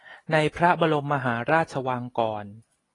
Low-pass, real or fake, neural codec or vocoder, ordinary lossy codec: 10.8 kHz; real; none; AAC, 32 kbps